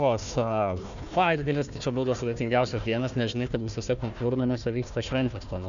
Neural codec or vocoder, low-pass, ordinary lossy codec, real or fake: codec, 16 kHz, 1 kbps, FunCodec, trained on Chinese and English, 50 frames a second; 7.2 kHz; MP3, 96 kbps; fake